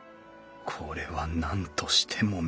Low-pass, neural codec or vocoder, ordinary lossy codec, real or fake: none; none; none; real